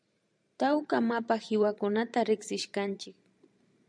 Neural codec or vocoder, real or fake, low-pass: vocoder, 44.1 kHz, 128 mel bands every 512 samples, BigVGAN v2; fake; 9.9 kHz